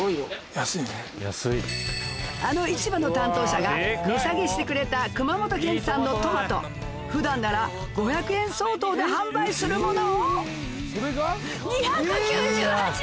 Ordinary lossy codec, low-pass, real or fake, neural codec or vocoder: none; none; real; none